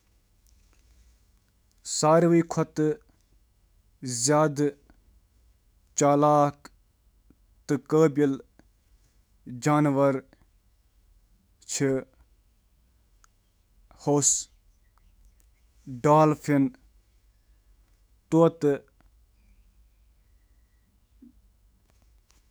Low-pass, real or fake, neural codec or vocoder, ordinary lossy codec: none; fake; autoencoder, 48 kHz, 128 numbers a frame, DAC-VAE, trained on Japanese speech; none